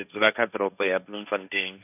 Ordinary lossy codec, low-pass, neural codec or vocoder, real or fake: none; 3.6 kHz; codec, 16 kHz, 1.1 kbps, Voila-Tokenizer; fake